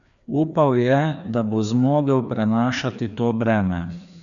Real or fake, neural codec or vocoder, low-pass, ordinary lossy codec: fake; codec, 16 kHz, 2 kbps, FreqCodec, larger model; 7.2 kHz; none